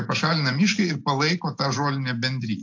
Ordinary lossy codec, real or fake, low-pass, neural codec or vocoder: AAC, 48 kbps; real; 7.2 kHz; none